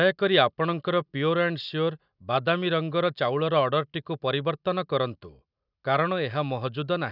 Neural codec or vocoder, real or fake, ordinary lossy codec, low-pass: none; real; none; 5.4 kHz